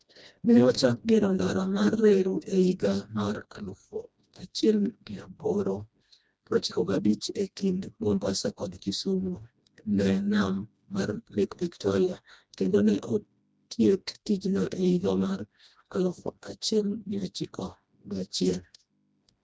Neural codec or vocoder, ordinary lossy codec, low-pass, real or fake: codec, 16 kHz, 1 kbps, FreqCodec, smaller model; none; none; fake